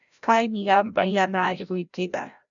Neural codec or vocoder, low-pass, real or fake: codec, 16 kHz, 0.5 kbps, FreqCodec, larger model; 7.2 kHz; fake